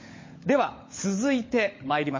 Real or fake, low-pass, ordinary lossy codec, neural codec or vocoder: fake; 7.2 kHz; MP3, 32 kbps; codec, 16 kHz, 16 kbps, FunCodec, trained on LibriTTS, 50 frames a second